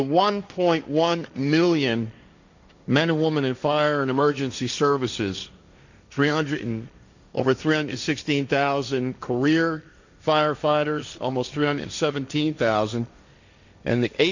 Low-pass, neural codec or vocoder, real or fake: 7.2 kHz; codec, 16 kHz, 1.1 kbps, Voila-Tokenizer; fake